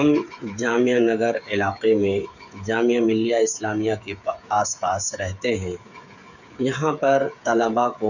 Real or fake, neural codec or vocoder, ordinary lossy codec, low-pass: fake; codec, 16 kHz, 8 kbps, FreqCodec, smaller model; none; 7.2 kHz